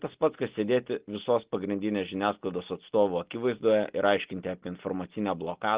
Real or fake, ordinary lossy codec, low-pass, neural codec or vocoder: real; Opus, 16 kbps; 3.6 kHz; none